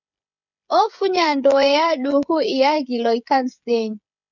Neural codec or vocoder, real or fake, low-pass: codec, 16 kHz, 8 kbps, FreqCodec, smaller model; fake; 7.2 kHz